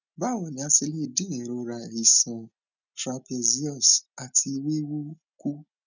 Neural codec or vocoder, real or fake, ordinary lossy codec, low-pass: none; real; none; 7.2 kHz